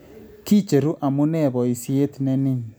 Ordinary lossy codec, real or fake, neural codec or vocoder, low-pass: none; real; none; none